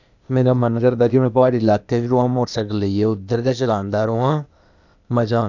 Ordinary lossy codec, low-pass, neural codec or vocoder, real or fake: none; 7.2 kHz; codec, 16 kHz, 0.8 kbps, ZipCodec; fake